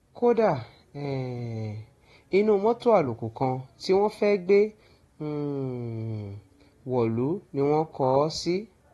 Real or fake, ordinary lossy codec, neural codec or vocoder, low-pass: real; AAC, 32 kbps; none; 19.8 kHz